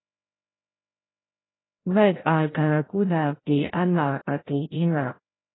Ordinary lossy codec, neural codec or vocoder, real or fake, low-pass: AAC, 16 kbps; codec, 16 kHz, 0.5 kbps, FreqCodec, larger model; fake; 7.2 kHz